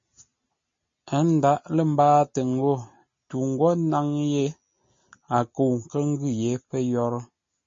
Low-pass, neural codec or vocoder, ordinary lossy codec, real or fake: 7.2 kHz; none; MP3, 32 kbps; real